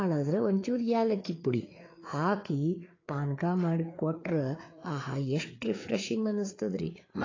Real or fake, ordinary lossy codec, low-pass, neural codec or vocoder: fake; AAC, 32 kbps; 7.2 kHz; codec, 16 kHz, 4 kbps, FreqCodec, larger model